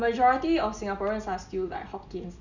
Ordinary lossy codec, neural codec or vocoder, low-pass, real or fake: none; none; 7.2 kHz; real